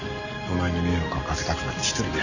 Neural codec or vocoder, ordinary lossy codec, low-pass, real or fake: none; none; 7.2 kHz; real